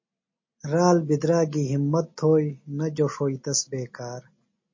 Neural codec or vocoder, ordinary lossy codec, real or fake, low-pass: none; MP3, 32 kbps; real; 7.2 kHz